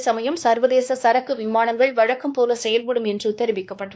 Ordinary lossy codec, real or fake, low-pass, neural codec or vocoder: none; fake; none; codec, 16 kHz, 2 kbps, X-Codec, WavLM features, trained on Multilingual LibriSpeech